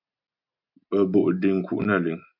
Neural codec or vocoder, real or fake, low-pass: none; real; 5.4 kHz